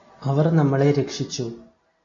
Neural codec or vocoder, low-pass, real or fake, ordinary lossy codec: none; 7.2 kHz; real; AAC, 32 kbps